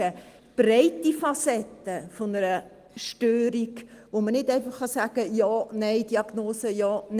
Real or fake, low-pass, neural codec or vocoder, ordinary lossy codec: real; 14.4 kHz; none; Opus, 24 kbps